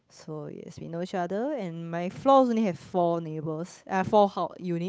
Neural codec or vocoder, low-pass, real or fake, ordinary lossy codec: codec, 16 kHz, 8 kbps, FunCodec, trained on Chinese and English, 25 frames a second; none; fake; none